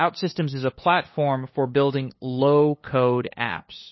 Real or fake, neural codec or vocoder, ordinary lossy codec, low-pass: fake; codec, 16 kHz, 2 kbps, FunCodec, trained on LibriTTS, 25 frames a second; MP3, 24 kbps; 7.2 kHz